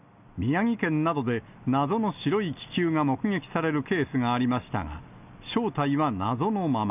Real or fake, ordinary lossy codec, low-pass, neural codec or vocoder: real; none; 3.6 kHz; none